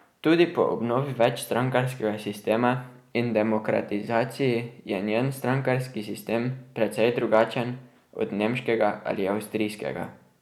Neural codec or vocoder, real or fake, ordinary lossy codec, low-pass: none; real; none; 19.8 kHz